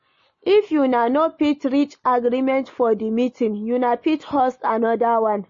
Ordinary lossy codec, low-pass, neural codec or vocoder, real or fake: MP3, 32 kbps; 7.2 kHz; none; real